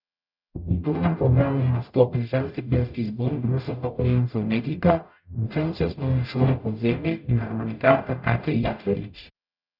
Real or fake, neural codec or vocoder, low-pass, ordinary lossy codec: fake; codec, 44.1 kHz, 0.9 kbps, DAC; 5.4 kHz; none